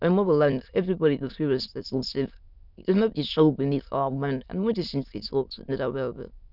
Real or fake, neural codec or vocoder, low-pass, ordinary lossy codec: fake; autoencoder, 22.05 kHz, a latent of 192 numbers a frame, VITS, trained on many speakers; 5.4 kHz; none